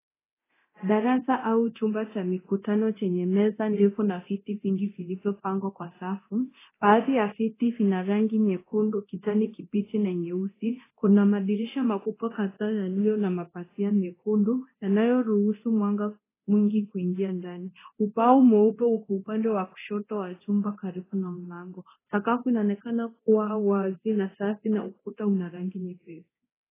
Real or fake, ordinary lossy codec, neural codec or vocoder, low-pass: fake; AAC, 16 kbps; codec, 24 kHz, 0.9 kbps, DualCodec; 3.6 kHz